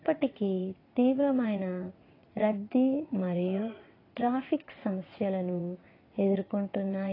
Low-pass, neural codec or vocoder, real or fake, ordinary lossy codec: 5.4 kHz; vocoder, 22.05 kHz, 80 mel bands, WaveNeXt; fake; AAC, 24 kbps